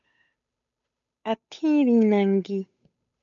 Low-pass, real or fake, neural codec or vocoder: 7.2 kHz; fake; codec, 16 kHz, 8 kbps, FunCodec, trained on Chinese and English, 25 frames a second